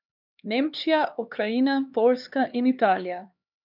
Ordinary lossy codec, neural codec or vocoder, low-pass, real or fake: none; codec, 16 kHz, 2 kbps, X-Codec, HuBERT features, trained on LibriSpeech; 5.4 kHz; fake